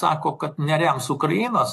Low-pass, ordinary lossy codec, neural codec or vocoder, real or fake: 14.4 kHz; AAC, 48 kbps; none; real